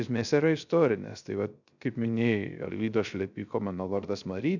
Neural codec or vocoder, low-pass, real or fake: codec, 16 kHz, 0.3 kbps, FocalCodec; 7.2 kHz; fake